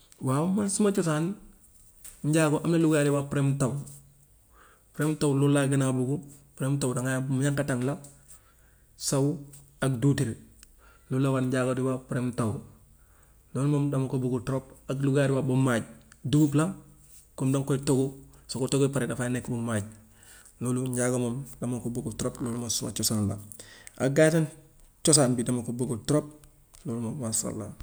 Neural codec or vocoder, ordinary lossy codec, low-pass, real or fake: none; none; none; real